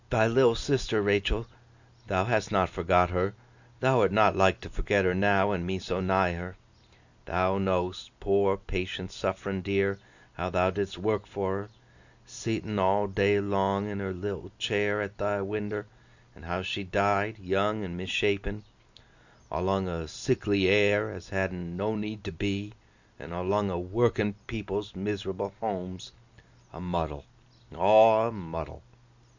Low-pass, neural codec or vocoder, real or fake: 7.2 kHz; none; real